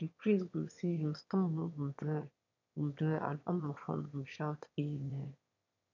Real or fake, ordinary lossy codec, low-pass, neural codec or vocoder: fake; none; 7.2 kHz; autoencoder, 22.05 kHz, a latent of 192 numbers a frame, VITS, trained on one speaker